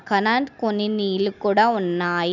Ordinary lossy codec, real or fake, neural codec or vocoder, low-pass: none; real; none; 7.2 kHz